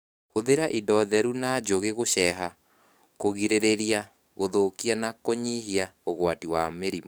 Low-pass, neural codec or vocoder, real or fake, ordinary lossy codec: none; codec, 44.1 kHz, 7.8 kbps, DAC; fake; none